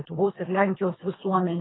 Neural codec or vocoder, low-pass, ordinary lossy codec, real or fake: codec, 24 kHz, 3 kbps, HILCodec; 7.2 kHz; AAC, 16 kbps; fake